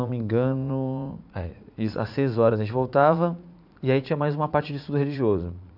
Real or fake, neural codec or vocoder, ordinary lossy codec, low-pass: fake; vocoder, 44.1 kHz, 80 mel bands, Vocos; none; 5.4 kHz